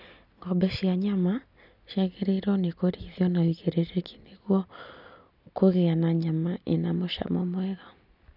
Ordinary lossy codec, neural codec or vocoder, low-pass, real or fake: none; none; 5.4 kHz; real